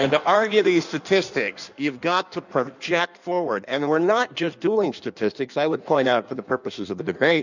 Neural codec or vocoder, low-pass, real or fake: codec, 16 kHz in and 24 kHz out, 1.1 kbps, FireRedTTS-2 codec; 7.2 kHz; fake